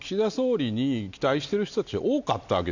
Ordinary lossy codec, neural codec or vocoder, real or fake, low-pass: none; none; real; 7.2 kHz